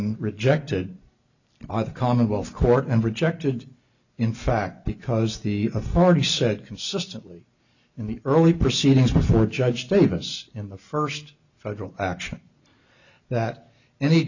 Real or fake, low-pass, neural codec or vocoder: real; 7.2 kHz; none